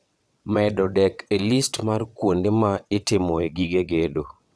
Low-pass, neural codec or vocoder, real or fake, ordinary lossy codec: none; vocoder, 22.05 kHz, 80 mel bands, WaveNeXt; fake; none